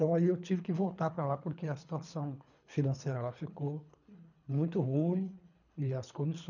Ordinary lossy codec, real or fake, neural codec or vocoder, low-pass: none; fake; codec, 24 kHz, 3 kbps, HILCodec; 7.2 kHz